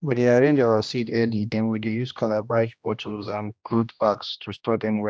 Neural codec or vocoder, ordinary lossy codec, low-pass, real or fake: codec, 16 kHz, 1 kbps, X-Codec, HuBERT features, trained on general audio; none; none; fake